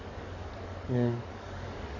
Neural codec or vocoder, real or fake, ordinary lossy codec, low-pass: codec, 44.1 kHz, 7.8 kbps, DAC; fake; none; 7.2 kHz